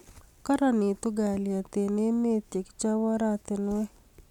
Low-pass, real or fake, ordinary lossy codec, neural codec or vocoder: 19.8 kHz; real; none; none